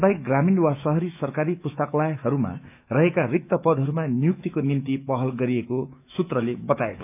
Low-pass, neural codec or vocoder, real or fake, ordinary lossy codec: 3.6 kHz; autoencoder, 48 kHz, 128 numbers a frame, DAC-VAE, trained on Japanese speech; fake; none